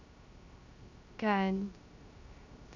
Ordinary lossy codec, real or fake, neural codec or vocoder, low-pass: none; fake; codec, 16 kHz, 0.3 kbps, FocalCodec; 7.2 kHz